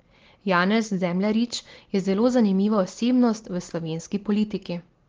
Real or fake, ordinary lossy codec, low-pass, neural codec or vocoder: real; Opus, 16 kbps; 7.2 kHz; none